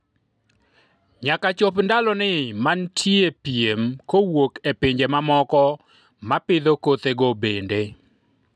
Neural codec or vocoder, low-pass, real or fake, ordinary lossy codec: none; none; real; none